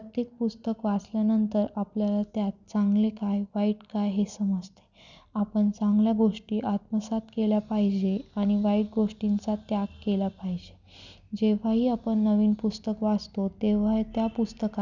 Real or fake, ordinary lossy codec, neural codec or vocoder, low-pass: real; none; none; 7.2 kHz